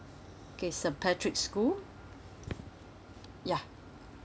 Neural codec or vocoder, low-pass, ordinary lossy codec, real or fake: none; none; none; real